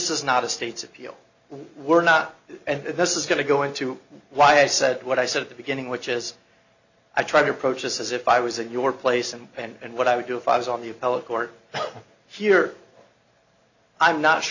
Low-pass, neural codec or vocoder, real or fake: 7.2 kHz; none; real